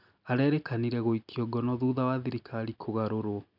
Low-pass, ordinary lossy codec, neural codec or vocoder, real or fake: 5.4 kHz; none; none; real